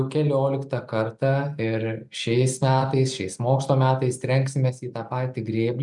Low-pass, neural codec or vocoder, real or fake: 10.8 kHz; none; real